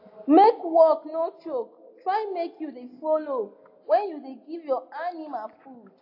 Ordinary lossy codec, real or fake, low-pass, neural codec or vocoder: AAC, 32 kbps; real; 5.4 kHz; none